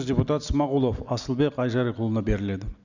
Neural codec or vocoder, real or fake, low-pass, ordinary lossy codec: none; real; 7.2 kHz; none